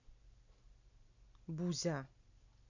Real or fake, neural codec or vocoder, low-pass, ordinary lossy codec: real; none; 7.2 kHz; none